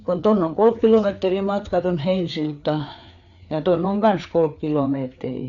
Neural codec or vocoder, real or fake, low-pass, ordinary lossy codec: codec, 16 kHz, 4 kbps, FreqCodec, larger model; fake; 7.2 kHz; none